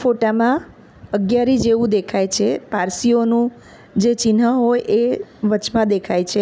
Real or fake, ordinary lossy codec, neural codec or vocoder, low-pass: real; none; none; none